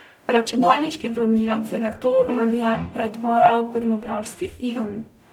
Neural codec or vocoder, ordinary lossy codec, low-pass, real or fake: codec, 44.1 kHz, 0.9 kbps, DAC; none; 19.8 kHz; fake